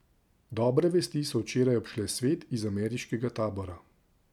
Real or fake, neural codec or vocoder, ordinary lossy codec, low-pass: real; none; none; 19.8 kHz